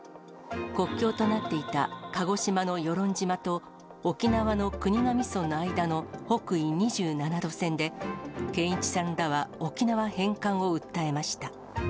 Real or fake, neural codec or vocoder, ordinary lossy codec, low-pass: real; none; none; none